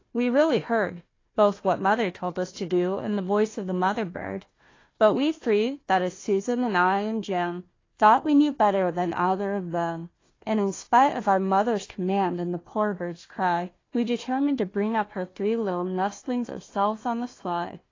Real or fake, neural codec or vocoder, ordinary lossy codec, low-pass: fake; codec, 16 kHz, 1 kbps, FunCodec, trained on Chinese and English, 50 frames a second; AAC, 32 kbps; 7.2 kHz